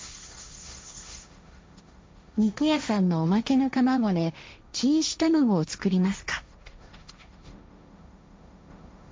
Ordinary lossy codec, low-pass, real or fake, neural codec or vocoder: none; none; fake; codec, 16 kHz, 1.1 kbps, Voila-Tokenizer